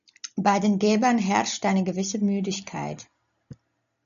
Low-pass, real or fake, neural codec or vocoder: 7.2 kHz; real; none